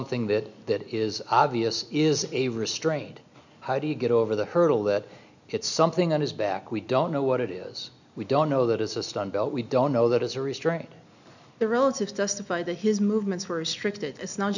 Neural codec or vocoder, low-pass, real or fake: none; 7.2 kHz; real